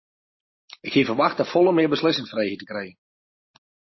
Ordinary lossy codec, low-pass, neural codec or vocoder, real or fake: MP3, 24 kbps; 7.2 kHz; none; real